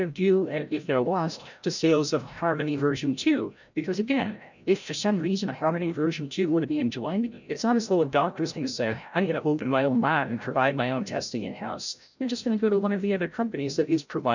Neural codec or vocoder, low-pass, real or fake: codec, 16 kHz, 0.5 kbps, FreqCodec, larger model; 7.2 kHz; fake